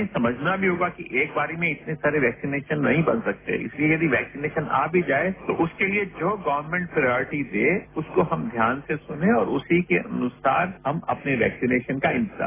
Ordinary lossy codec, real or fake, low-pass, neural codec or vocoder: AAC, 16 kbps; real; 3.6 kHz; none